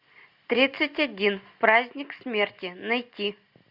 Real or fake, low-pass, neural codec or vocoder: real; 5.4 kHz; none